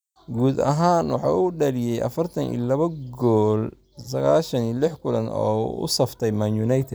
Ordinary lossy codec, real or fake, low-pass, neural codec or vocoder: none; real; none; none